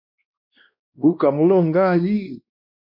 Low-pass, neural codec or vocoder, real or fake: 5.4 kHz; codec, 16 kHz, 1 kbps, X-Codec, WavLM features, trained on Multilingual LibriSpeech; fake